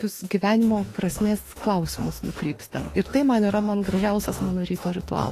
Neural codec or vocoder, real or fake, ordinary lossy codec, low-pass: autoencoder, 48 kHz, 32 numbers a frame, DAC-VAE, trained on Japanese speech; fake; AAC, 48 kbps; 14.4 kHz